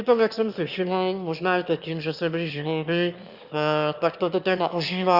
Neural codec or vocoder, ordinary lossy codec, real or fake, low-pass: autoencoder, 22.05 kHz, a latent of 192 numbers a frame, VITS, trained on one speaker; Opus, 64 kbps; fake; 5.4 kHz